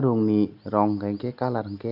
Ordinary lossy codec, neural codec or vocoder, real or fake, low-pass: none; none; real; 5.4 kHz